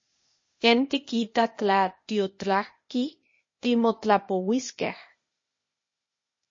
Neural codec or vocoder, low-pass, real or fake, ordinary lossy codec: codec, 16 kHz, 0.8 kbps, ZipCodec; 7.2 kHz; fake; MP3, 32 kbps